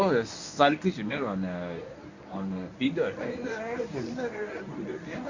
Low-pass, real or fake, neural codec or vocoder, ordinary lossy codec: 7.2 kHz; fake; codec, 24 kHz, 0.9 kbps, WavTokenizer, medium speech release version 1; none